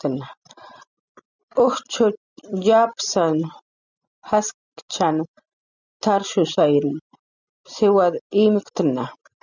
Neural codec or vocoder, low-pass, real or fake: none; 7.2 kHz; real